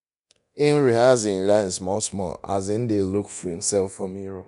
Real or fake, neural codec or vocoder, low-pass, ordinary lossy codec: fake; codec, 24 kHz, 0.9 kbps, DualCodec; 10.8 kHz; none